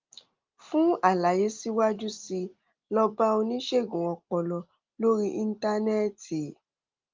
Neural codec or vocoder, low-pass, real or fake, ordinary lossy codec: none; 7.2 kHz; real; Opus, 32 kbps